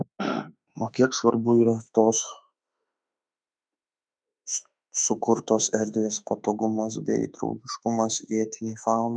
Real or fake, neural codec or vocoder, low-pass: fake; autoencoder, 48 kHz, 32 numbers a frame, DAC-VAE, trained on Japanese speech; 9.9 kHz